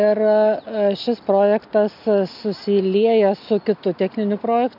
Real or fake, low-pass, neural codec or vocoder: real; 5.4 kHz; none